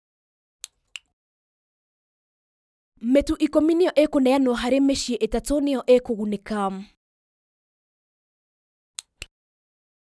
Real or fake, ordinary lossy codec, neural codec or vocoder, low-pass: real; none; none; none